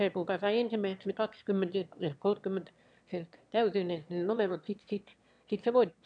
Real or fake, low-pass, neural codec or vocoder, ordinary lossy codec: fake; 9.9 kHz; autoencoder, 22.05 kHz, a latent of 192 numbers a frame, VITS, trained on one speaker; none